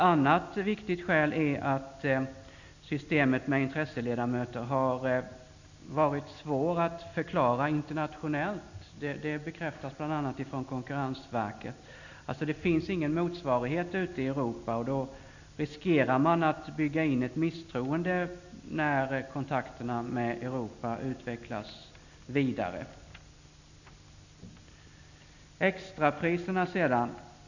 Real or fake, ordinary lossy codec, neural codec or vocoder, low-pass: real; none; none; 7.2 kHz